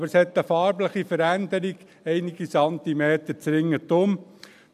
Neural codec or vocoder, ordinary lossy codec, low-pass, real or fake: none; AAC, 96 kbps; 14.4 kHz; real